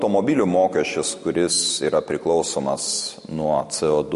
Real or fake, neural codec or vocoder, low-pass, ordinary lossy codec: real; none; 14.4 kHz; MP3, 48 kbps